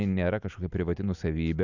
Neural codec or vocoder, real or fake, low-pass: none; real; 7.2 kHz